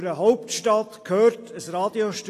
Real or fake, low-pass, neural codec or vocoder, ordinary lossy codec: real; 14.4 kHz; none; AAC, 48 kbps